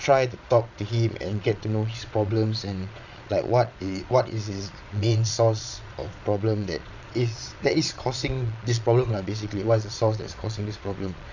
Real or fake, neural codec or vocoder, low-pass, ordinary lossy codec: fake; vocoder, 22.05 kHz, 80 mel bands, Vocos; 7.2 kHz; none